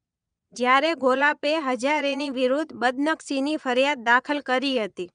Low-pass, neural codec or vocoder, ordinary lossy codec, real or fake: 9.9 kHz; vocoder, 22.05 kHz, 80 mel bands, Vocos; none; fake